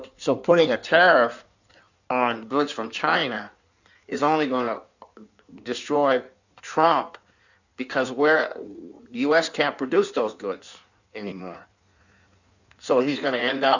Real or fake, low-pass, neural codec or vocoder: fake; 7.2 kHz; codec, 16 kHz in and 24 kHz out, 1.1 kbps, FireRedTTS-2 codec